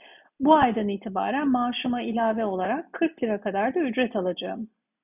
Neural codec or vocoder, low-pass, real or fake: none; 3.6 kHz; real